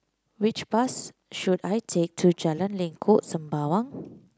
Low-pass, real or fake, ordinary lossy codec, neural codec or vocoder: none; real; none; none